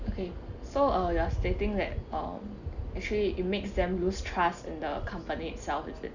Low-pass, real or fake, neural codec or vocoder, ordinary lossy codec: 7.2 kHz; real; none; none